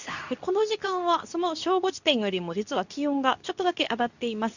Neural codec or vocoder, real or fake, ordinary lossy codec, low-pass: codec, 24 kHz, 0.9 kbps, WavTokenizer, medium speech release version 2; fake; none; 7.2 kHz